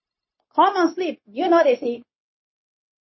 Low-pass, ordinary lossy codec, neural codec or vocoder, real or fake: 7.2 kHz; MP3, 24 kbps; codec, 16 kHz, 0.9 kbps, LongCat-Audio-Codec; fake